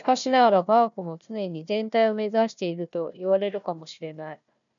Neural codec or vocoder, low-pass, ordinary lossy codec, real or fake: codec, 16 kHz, 1 kbps, FunCodec, trained on Chinese and English, 50 frames a second; 7.2 kHz; MP3, 96 kbps; fake